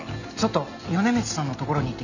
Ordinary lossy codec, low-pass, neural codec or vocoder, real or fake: none; 7.2 kHz; none; real